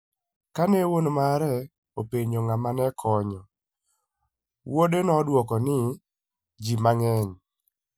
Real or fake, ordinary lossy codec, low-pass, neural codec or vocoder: real; none; none; none